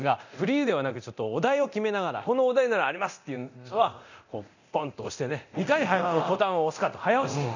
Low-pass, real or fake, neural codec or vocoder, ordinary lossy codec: 7.2 kHz; fake; codec, 24 kHz, 0.9 kbps, DualCodec; none